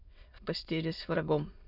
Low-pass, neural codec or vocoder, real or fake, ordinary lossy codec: 5.4 kHz; autoencoder, 22.05 kHz, a latent of 192 numbers a frame, VITS, trained on many speakers; fake; none